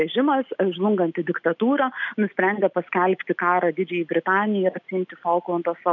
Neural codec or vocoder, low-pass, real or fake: none; 7.2 kHz; real